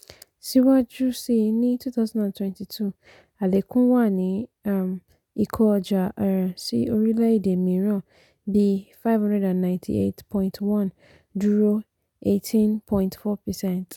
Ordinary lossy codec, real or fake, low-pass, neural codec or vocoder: none; real; 19.8 kHz; none